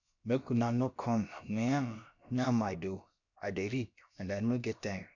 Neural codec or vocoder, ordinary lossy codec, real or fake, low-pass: codec, 16 kHz, about 1 kbps, DyCAST, with the encoder's durations; none; fake; 7.2 kHz